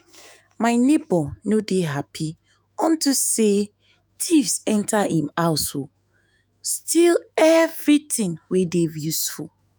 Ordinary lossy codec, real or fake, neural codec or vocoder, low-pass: none; fake; autoencoder, 48 kHz, 128 numbers a frame, DAC-VAE, trained on Japanese speech; none